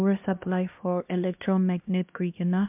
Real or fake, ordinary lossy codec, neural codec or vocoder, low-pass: fake; MP3, 32 kbps; codec, 16 kHz, 1 kbps, X-Codec, HuBERT features, trained on LibriSpeech; 3.6 kHz